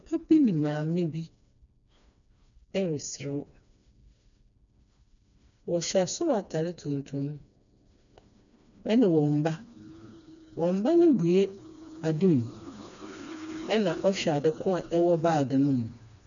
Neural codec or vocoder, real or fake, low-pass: codec, 16 kHz, 2 kbps, FreqCodec, smaller model; fake; 7.2 kHz